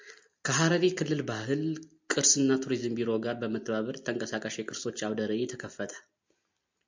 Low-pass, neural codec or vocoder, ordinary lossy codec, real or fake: 7.2 kHz; none; MP3, 64 kbps; real